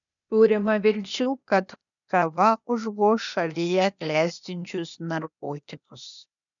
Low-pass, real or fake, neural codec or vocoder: 7.2 kHz; fake; codec, 16 kHz, 0.8 kbps, ZipCodec